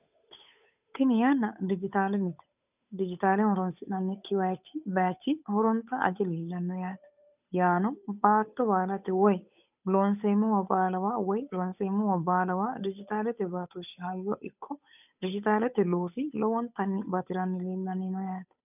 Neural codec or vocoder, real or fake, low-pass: codec, 16 kHz, 8 kbps, FunCodec, trained on Chinese and English, 25 frames a second; fake; 3.6 kHz